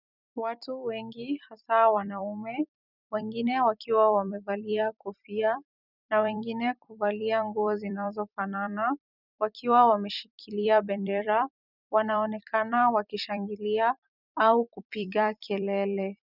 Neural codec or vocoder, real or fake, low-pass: vocoder, 44.1 kHz, 128 mel bands every 256 samples, BigVGAN v2; fake; 5.4 kHz